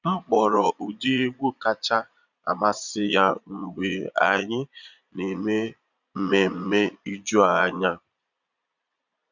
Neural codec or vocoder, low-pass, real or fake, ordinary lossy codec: vocoder, 22.05 kHz, 80 mel bands, Vocos; 7.2 kHz; fake; none